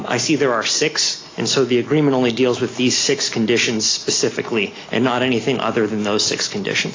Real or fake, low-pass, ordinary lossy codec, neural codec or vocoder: real; 7.2 kHz; AAC, 32 kbps; none